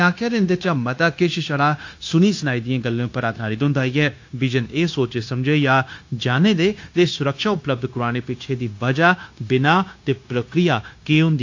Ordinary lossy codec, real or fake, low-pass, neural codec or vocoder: AAC, 48 kbps; fake; 7.2 kHz; codec, 16 kHz, 0.9 kbps, LongCat-Audio-Codec